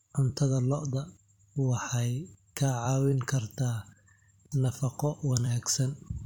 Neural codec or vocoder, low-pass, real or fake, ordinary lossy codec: vocoder, 44.1 kHz, 128 mel bands every 256 samples, BigVGAN v2; 19.8 kHz; fake; MP3, 96 kbps